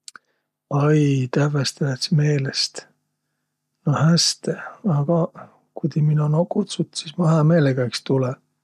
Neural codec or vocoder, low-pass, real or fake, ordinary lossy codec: none; 14.4 kHz; real; MP3, 96 kbps